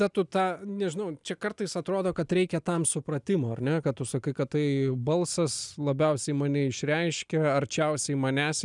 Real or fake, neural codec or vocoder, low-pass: real; none; 10.8 kHz